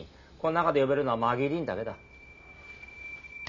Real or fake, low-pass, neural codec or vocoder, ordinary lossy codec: real; 7.2 kHz; none; none